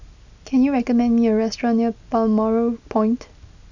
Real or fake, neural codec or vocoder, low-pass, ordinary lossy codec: real; none; 7.2 kHz; none